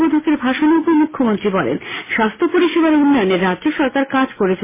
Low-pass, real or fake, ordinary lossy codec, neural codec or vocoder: 3.6 kHz; real; MP3, 16 kbps; none